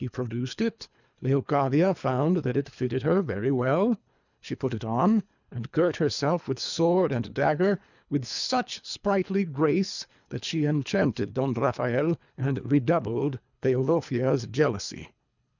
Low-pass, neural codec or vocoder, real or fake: 7.2 kHz; codec, 24 kHz, 3 kbps, HILCodec; fake